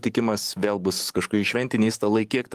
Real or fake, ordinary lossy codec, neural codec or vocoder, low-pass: fake; Opus, 24 kbps; codec, 44.1 kHz, 7.8 kbps, DAC; 14.4 kHz